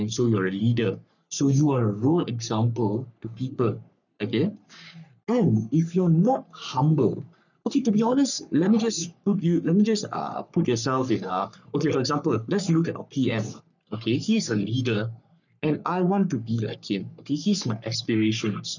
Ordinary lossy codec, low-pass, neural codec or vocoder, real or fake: none; 7.2 kHz; codec, 44.1 kHz, 3.4 kbps, Pupu-Codec; fake